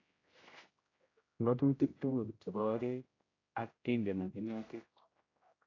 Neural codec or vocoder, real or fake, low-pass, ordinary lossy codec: codec, 16 kHz, 0.5 kbps, X-Codec, HuBERT features, trained on general audio; fake; 7.2 kHz; AAC, 32 kbps